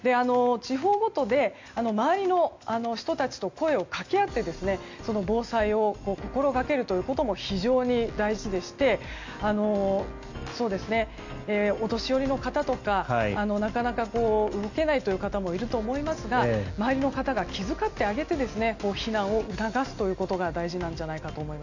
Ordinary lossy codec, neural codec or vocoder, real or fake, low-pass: AAC, 48 kbps; none; real; 7.2 kHz